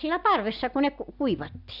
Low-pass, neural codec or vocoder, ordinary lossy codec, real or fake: 5.4 kHz; none; none; real